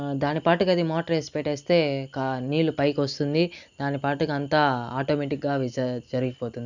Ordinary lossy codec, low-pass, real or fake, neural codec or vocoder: none; 7.2 kHz; real; none